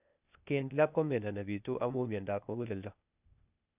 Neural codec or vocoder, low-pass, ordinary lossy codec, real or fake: codec, 16 kHz, 0.8 kbps, ZipCodec; 3.6 kHz; none; fake